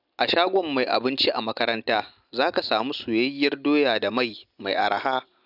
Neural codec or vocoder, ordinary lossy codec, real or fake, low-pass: none; none; real; 5.4 kHz